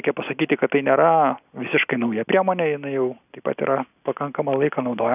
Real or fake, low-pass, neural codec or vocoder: real; 3.6 kHz; none